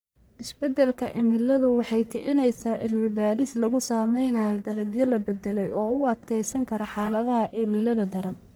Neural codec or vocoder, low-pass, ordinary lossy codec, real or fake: codec, 44.1 kHz, 1.7 kbps, Pupu-Codec; none; none; fake